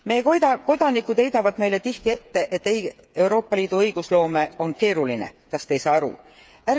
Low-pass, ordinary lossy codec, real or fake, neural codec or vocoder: none; none; fake; codec, 16 kHz, 8 kbps, FreqCodec, smaller model